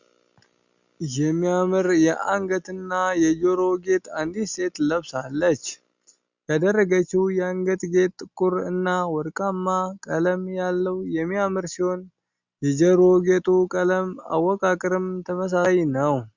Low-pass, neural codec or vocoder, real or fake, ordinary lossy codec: 7.2 kHz; none; real; Opus, 64 kbps